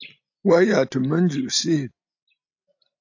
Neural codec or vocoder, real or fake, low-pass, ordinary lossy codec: none; real; 7.2 kHz; AAC, 48 kbps